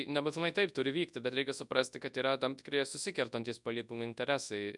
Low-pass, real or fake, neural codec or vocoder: 10.8 kHz; fake; codec, 24 kHz, 0.9 kbps, WavTokenizer, large speech release